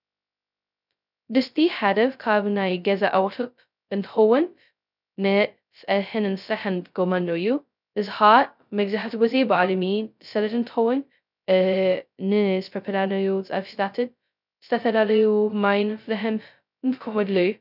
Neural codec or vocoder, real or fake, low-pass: codec, 16 kHz, 0.2 kbps, FocalCodec; fake; 5.4 kHz